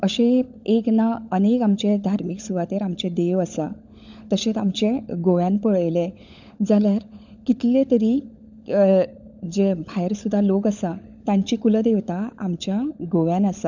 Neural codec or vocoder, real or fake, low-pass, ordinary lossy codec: codec, 16 kHz, 16 kbps, FunCodec, trained on LibriTTS, 50 frames a second; fake; 7.2 kHz; none